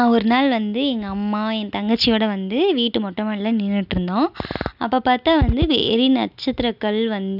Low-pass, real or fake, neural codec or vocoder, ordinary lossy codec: 5.4 kHz; real; none; none